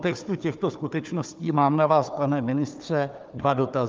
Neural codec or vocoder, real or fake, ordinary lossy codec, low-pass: codec, 16 kHz, 4 kbps, FunCodec, trained on Chinese and English, 50 frames a second; fake; Opus, 32 kbps; 7.2 kHz